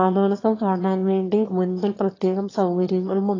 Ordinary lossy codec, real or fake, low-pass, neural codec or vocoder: AAC, 32 kbps; fake; 7.2 kHz; autoencoder, 22.05 kHz, a latent of 192 numbers a frame, VITS, trained on one speaker